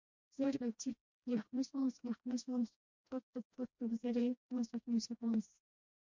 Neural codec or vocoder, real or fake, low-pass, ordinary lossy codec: codec, 16 kHz, 1 kbps, FreqCodec, smaller model; fake; 7.2 kHz; MP3, 48 kbps